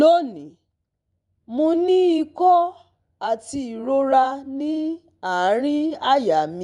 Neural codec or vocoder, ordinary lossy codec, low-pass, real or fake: vocoder, 24 kHz, 100 mel bands, Vocos; none; 10.8 kHz; fake